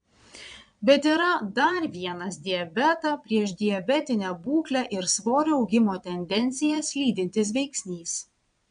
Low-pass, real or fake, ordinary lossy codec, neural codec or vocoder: 9.9 kHz; fake; MP3, 96 kbps; vocoder, 22.05 kHz, 80 mel bands, Vocos